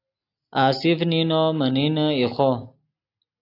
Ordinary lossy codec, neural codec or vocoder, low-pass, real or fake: AAC, 32 kbps; none; 5.4 kHz; real